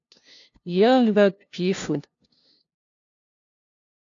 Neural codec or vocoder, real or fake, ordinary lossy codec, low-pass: codec, 16 kHz, 0.5 kbps, FunCodec, trained on LibriTTS, 25 frames a second; fake; AAC, 48 kbps; 7.2 kHz